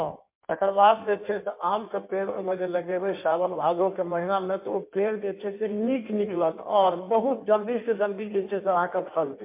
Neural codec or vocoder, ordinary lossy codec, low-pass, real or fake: codec, 16 kHz in and 24 kHz out, 1.1 kbps, FireRedTTS-2 codec; MP3, 32 kbps; 3.6 kHz; fake